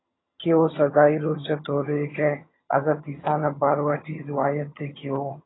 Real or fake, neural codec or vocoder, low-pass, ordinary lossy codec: fake; vocoder, 22.05 kHz, 80 mel bands, HiFi-GAN; 7.2 kHz; AAC, 16 kbps